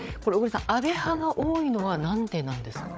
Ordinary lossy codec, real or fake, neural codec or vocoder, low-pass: none; fake; codec, 16 kHz, 8 kbps, FreqCodec, larger model; none